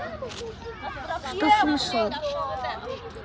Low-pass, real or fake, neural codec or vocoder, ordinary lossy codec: none; real; none; none